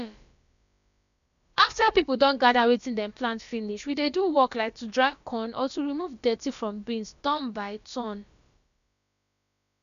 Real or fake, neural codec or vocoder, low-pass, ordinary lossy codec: fake; codec, 16 kHz, about 1 kbps, DyCAST, with the encoder's durations; 7.2 kHz; none